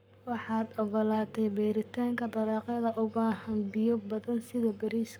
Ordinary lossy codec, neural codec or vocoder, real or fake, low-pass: none; codec, 44.1 kHz, 7.8 kbps, Pupu-Codec; fake; none